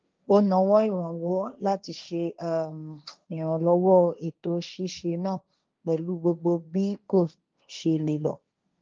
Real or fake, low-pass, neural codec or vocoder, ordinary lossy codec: fake; 7.2 kHz; codec, 16 kHz, 1.1 kbps, Voila-Tokenizer; Opus, 24 kbps